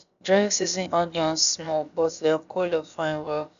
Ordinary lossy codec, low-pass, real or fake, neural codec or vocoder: none; 7.2 kHz; fake; codec, 16 kHz, about 1 kbps, DyCAST, with the encoder's durations